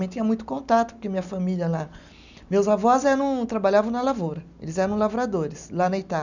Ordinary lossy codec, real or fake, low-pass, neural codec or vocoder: none; real; 7.2 kHz; none